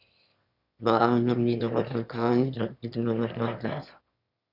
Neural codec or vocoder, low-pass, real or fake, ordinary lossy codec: autoencoder, 22.05 kHz, a latent of 192 numbers a frame, VITS, trained on one speaker; 5.4 kHz; fake; Opus, 64 kbps